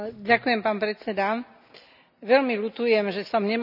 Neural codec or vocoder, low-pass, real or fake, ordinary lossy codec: none; 5.4 kHz; real; none